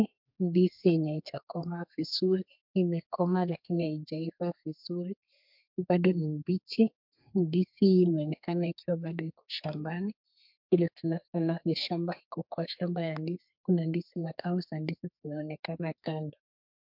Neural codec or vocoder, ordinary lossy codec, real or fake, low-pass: codec, 32 kHz, 1.9 kbps, SNAC; MP3, 48 kbps; fake; 5.4 kHz